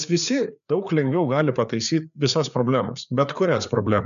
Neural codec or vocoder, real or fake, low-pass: codec, 16 kHz, 4 kbps, FreqCodec, larger model; fake; 7.2 kHz